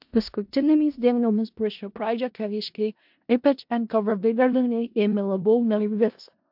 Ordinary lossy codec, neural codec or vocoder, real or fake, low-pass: MP3, 48 kbps; codec, 16 kHz in and 24 kHz out, 0.4 kbps, LongCat-Audio-Codec, four codebook decoder; fake; 5.4 kHz